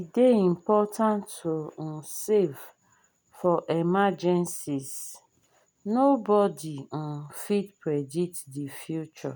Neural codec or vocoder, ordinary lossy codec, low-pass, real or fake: none; none; none; real